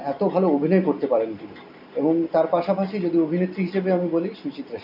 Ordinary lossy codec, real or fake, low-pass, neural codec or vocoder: none; real; 5.4 kHz; none